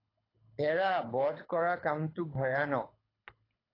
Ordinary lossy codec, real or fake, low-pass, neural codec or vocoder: AAC, 24 kbps; fake; 5.4 kHz; codec, 24 kHz, 6 kbps, HILCodec